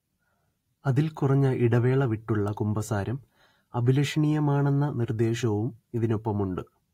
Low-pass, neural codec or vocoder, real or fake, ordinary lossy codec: 19.8 kHz; none; real; AAC, 48 kbps